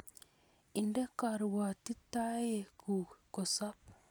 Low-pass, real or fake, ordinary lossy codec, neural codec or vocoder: none; real; none; none